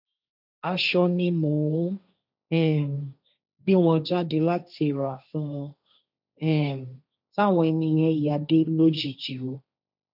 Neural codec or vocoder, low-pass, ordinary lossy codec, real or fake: codec, 16 kHz, 1.1 kbps, Voila-Tokenizer; 5.4 kHz; none; fake